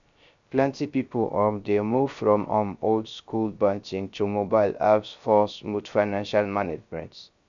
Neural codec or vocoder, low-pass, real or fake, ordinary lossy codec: codec, 16 kHz, 0.3 kbps, FocalCodec; 7.2 kHz; fake; none